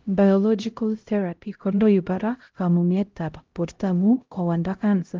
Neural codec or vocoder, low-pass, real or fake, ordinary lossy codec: codec, 16 kHz, 0.5 kbps, X-Codec, HuBERT features, trained on LibriSpeech; 7.2 kHz; fake; Opus, 24 kbps